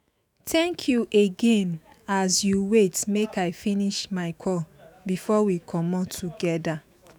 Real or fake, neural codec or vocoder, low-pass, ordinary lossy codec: fake; autoencoder, 48 kHz, 128 numbers a frame, DAC-VAE, trained on Japanese speech; none; none